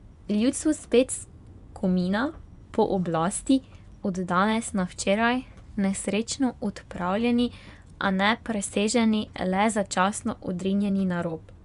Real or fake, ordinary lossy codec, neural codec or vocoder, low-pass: fake; none; vocoder, 24 kHz, 100 mel bands, Vocos; 10.8 kHz